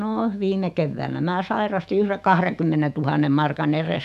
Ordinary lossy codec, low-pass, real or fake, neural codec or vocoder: none; 14.4 kHz; fake; autoencoder, 48 kHz, 128 numbers a frame, DAC-VAE, trained on Japanese speech